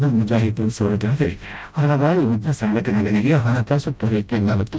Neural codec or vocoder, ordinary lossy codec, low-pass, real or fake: codec, 16 kHz, 0.5 kbps, FreqCodec, smaller model; none; none; fake